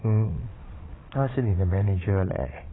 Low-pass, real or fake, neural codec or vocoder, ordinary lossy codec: 7.2 kHz; fake; vocoder, 22.05 kHz, 80 mel bands, Vocos; AAC, 16 kbps